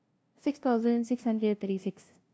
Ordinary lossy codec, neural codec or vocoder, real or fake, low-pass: none; codec, 16 kHz, 0.5 kbps, FunCodec, trained on LibriTTS, 25 frames a second; fake; none